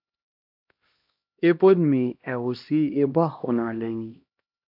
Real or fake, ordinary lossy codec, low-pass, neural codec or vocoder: fake; AAC, 48 kbps; 5.4 kHz; codec, 16 kHz, 1 kbps, X-Codec, HuBERT features, trained on LibriSpeech